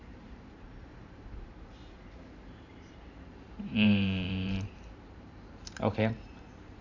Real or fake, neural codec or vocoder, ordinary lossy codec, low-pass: fake; vocoder, 44.1 kHz, 128 mel bands every 512 samples, BigVGAN v2; none; 7.2 kHz